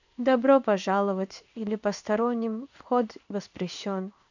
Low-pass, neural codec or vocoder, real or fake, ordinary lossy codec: 7.2 kHz; codec, 16 kHz in and 24 kHz out, 1 kbps, XY-Tokenizer; fake; none